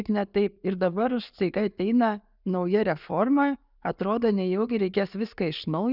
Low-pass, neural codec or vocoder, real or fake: 5.4 kHz; none; real